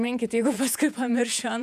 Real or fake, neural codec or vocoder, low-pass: fake; vocoder, 48 kHz, 128 mel bands, Vocos; 14.4 kHz